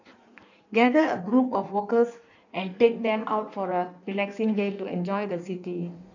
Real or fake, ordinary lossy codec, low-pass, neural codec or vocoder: fake; none; 7.2 kHz; codec, 16 kHz in and 24 kHz out, 1.1 kbps, FireRedTTS-2 codec